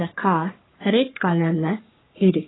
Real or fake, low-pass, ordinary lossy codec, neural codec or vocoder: fake; 7.2 kHz; AAC, 16 kbps; codec, 44.1 kHz, 3.4 kbps, Pupu-Codec